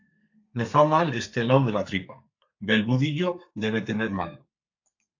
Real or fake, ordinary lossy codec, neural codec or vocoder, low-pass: fake; AAC, 48 kbps; codec, 32 kHz, 1.9 kbps, SNAC; 7.2 kHz